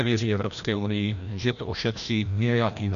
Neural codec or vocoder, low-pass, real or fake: codec, 16 kHz, 1 kbps, FreqCodec, larger model; 7.2 kHz; fake